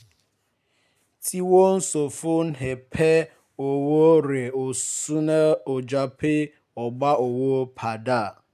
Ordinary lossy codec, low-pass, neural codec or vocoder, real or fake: none; 14.4 kHz; none; real